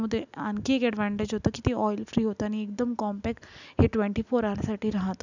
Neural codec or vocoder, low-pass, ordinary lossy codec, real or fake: none; 7.2 kHz; none; real